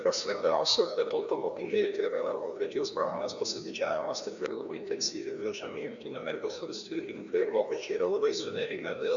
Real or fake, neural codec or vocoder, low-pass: fake; codec, 16 kHz, 1 kbps, FreqCodec, larger model; 7.2 kHz